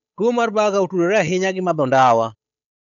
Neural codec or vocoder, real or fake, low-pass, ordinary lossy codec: codec, 16 kHz, 8 kbps, FunCodec, trained on Chinese and English, 25 frames a second; fake; 7.2 kHz; none